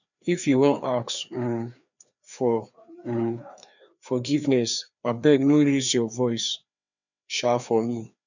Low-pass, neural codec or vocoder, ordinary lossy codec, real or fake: 7.2 kHz; codec, 16 kHz, 2 kbps, FreqCodec, larger model; none; fake